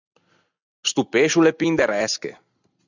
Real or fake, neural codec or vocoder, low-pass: real; none; 7.2 kHz